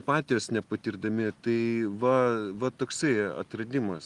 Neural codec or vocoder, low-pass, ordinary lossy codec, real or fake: none; 10.8 kHz; Opus, 24 kbps; real